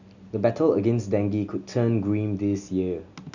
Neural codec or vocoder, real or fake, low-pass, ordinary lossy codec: none; real; 7.2 kHz; none